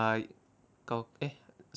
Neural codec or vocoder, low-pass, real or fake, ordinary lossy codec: none; none; real; none